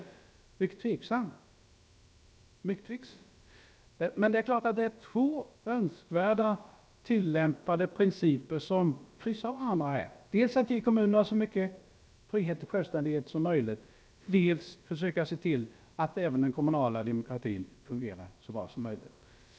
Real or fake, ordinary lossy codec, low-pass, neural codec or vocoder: fake; none; none; codec, 16 kHz, about 1 kbps, DyCAST, with the encoder's durations